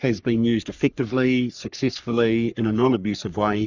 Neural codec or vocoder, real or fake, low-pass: codec, 44.1 kHz, 3.4 kbps, Pupu-Codec; fake; 7.2 kHz